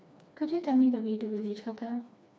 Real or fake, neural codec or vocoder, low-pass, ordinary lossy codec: fake; codec, 16 kHz, 2 kbps, FreqCodec, smaller model; none; none